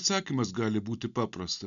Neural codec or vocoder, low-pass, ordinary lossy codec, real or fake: none; 7.2 kHz; MP3, 96 kbps; real